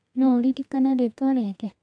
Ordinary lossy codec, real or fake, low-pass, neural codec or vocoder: AAC, 64 kbps; fake; 9.9 kHz; codec, 32 kHz, 1.9 kbps, SNAC